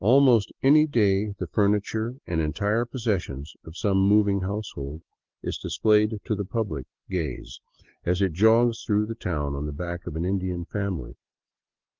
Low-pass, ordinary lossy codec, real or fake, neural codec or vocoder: 7.2 kHz; Opus, 16 kbps; real; none